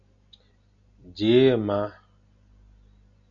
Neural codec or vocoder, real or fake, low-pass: none; real; 7.2 kHz